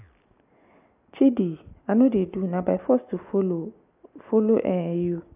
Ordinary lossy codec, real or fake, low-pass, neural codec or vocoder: AAC, 32 kbps; real; 3.6 kHz; none